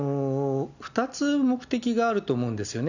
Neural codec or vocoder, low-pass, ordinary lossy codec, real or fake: none; 7.2 kHz; none; real